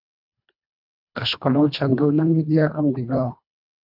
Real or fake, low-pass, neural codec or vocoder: fake; 5.4 kHz; codec, 24 kHz, 1.5 kbps, HILCodec